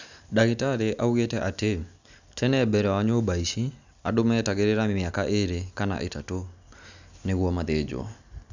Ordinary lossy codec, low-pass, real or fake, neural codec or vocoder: none; 7.2 kHz; real; none